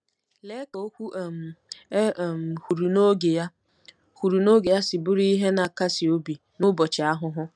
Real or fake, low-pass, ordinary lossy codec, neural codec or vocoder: real; none; none; none